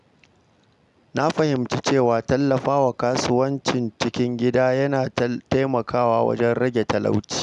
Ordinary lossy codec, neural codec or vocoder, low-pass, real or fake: none; none; 10.8 kHz; real